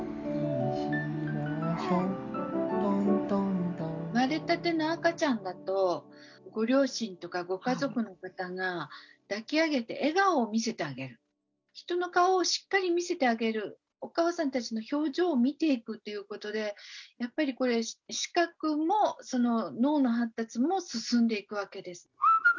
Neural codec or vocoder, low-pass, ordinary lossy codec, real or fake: none; 7.2 kHz; none; real